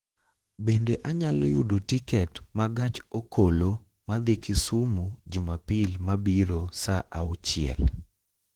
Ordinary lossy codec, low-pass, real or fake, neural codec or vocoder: Opus, 16 kbps; 19.8 kHz; fake; autoencoder, 48 kHz, 32 numbers a frame, DAC-VAE, trained on Japanese speech